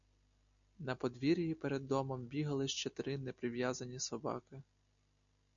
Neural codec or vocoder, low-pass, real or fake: none; 7.2 kHz; real